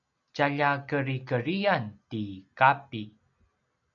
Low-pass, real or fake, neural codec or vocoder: 7.2 kHz; real; none